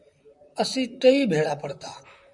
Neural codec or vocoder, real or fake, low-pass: vocoder, 44.1 kHz, 128 mel bands, Pupu-Vocoder; fake; 10.8 kHz